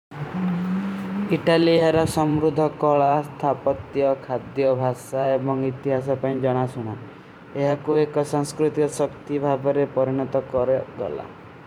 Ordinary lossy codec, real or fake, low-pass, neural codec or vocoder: none; fake; 19.8 kHz; vocoder, 44.1 kHz, 128 mel bands every 512 samples, BigVGAN v2